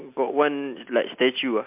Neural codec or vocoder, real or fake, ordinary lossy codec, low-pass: none; real; MP3, 32 kbps; 3.6 kHz